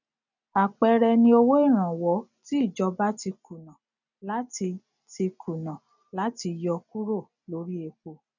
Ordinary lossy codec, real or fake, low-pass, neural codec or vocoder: none; real; 7.2 kHz; none